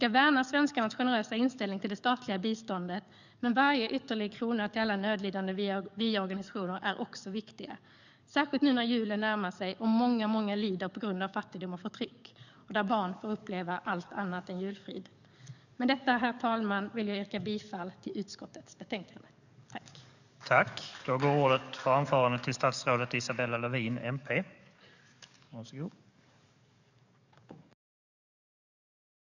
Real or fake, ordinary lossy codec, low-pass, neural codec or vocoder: fake; none; 7.2 kHz; codec, 44.1 kHz, 7.8 kbps, DAC